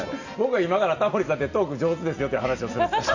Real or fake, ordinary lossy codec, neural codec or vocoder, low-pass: real; none; none; 7.2 kHz